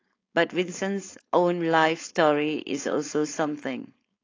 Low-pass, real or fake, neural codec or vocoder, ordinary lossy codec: 7.2 kHz; fake; codec, 16 kHz, 4.8 kbps, FACodec; AAC, 32 kbps